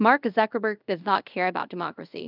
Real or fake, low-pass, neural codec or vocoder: fake; 5.4 kHz; codec, 24 kHz, 0.5 kbps, DualCodec